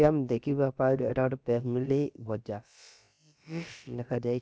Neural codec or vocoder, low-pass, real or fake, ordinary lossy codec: codec, 16 kHz, about 1 kbps, DyCAST, with the encoder's durations; none; fake; none